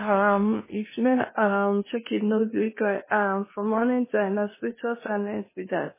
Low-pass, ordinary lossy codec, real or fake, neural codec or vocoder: 3.6 kHz; MP3, 16 kbps; fake; codec, 16 kHz in and 24 kHz out, 0.8 kbps, FocalCodec, streaming, 65536 codes